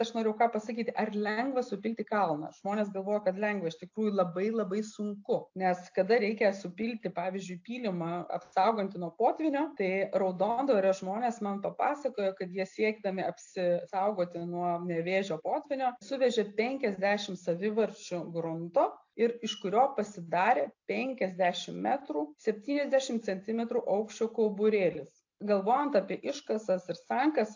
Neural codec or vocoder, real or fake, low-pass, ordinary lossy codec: none; real; 7.2 kHz; AAC, 48 kbps